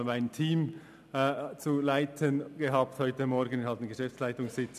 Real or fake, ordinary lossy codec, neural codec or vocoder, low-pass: real; none; none; 14.4 kHz